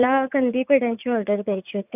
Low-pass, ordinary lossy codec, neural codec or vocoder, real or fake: 3.6 kHz; none; vocoder, 44.1 kHz, 80 mel bands, Vocos; fake